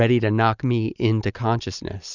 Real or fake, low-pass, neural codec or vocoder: real; 7.2 kHz; none